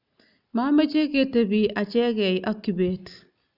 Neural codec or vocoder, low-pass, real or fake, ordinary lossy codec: none; 5.4 kHz; real; none